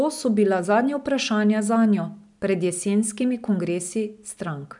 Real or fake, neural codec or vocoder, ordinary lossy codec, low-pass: real; none; none; 10.8 kHz